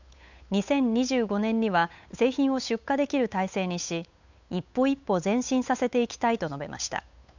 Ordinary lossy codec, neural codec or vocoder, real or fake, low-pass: none; codec, 16 kHz, 8 kbps, FunCodec, trained on Chinese and English, 25 frames a second; fake; 7.2 kHz